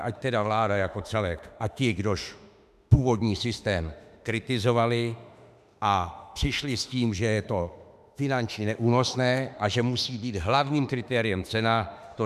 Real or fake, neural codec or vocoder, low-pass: fake; autoencoder, 48 kHz, 32 numbers a frame, DAC-VAE, trained on Japanese speech; 14.4 kHz